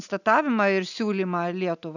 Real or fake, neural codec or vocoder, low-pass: real; none; 7.2 kHz